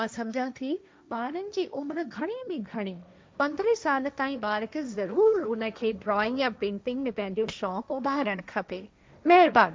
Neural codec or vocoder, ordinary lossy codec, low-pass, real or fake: codec, 16 kHz, 1.1 kbps, Voila-Tokenizer; none; none; fake